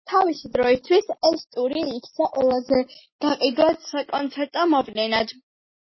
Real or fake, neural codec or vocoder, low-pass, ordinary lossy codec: real; none; 7.2 kHz; MP3, 24 kbps